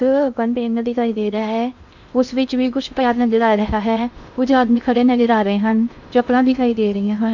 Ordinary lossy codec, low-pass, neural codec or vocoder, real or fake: none; 7.2 kHz; codec, 16 kHz in and 24 kHz out, 0.6 kbps, FocalCodec, streaming, 2048 codes; fake